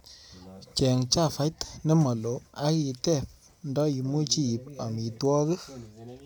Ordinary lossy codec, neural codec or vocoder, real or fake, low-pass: none; none; real; none